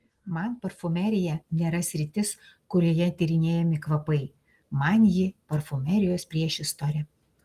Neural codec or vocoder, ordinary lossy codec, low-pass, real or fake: none; Opus, 24 kbps; 14.4 kHz; real